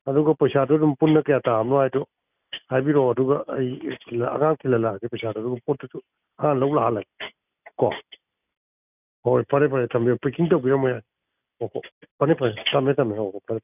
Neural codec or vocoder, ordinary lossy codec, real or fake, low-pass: none; none; real; 3.6 kHz